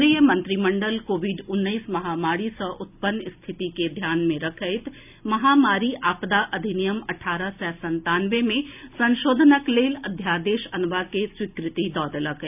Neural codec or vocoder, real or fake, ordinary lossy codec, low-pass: none; real; none; 3.6 kHz